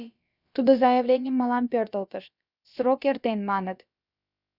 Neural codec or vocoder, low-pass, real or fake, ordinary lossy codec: codec, 16 kHz, about 1 kbps, DyCAST, with the encoder's durations; 5.4 kHz; fake; Opus, 64 kbps